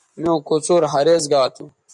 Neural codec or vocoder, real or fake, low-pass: vocoder, 24 kHz, 100 mel bands, Vocos; fake; 10.8 kHz